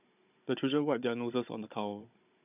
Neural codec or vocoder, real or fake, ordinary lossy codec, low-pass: codec, 16 kHz, 16 kbps, FunCodec, trained on Chinese and English, 50 frames a second; fake; none; 3.6 kHz